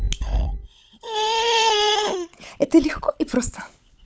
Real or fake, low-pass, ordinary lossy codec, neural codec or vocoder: fake; none; none; codec, 16 kHz, 16 kbps, FunCodec, trained on LibriTTS, 50 frames a second